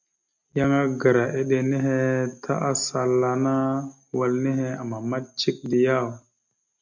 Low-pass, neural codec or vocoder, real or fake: 7.2 kHz; none; real